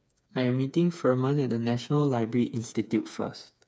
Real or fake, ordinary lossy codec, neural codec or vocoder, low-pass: fake; none; codec, 16 kHz, 4 kbps, FreqCodec, smaller model; none